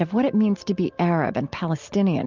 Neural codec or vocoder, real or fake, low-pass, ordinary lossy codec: none; real; 7.2 kHz; Opus, 24 kbps